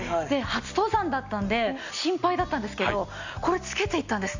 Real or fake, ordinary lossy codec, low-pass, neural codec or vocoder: real; Opus, 64 kbps; 7.2 kHz; none